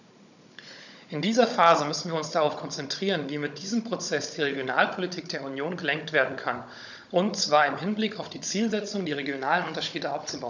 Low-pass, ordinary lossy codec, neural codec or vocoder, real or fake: 7.2 kHz; none; codec, 16 kHz, 16 kbps, FunCodec, trained on Chinese and English, 50 frames a second; fake